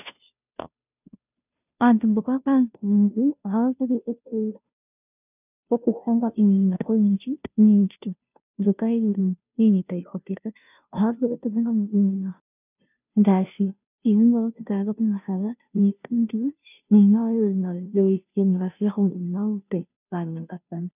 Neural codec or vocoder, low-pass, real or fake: codec, 16 kHz, 0.5 kbps, FunCodec, trained on Chinese and English, 25 frames a second; 3.6 kHz; fake